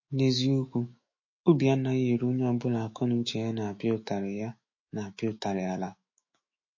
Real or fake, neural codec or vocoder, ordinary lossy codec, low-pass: fake; autoencoder, 48 kHz, 128 numbers a frame, DAC-VAE, trained on Japanese speech; MP3, 32 kbps; 7.2 kHz